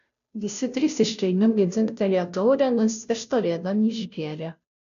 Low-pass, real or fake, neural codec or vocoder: 7.2 kHz; fake; codec, 16 kHz, 0.5 kbps, FunCodec, trained on Chinese and English, 25 frames a second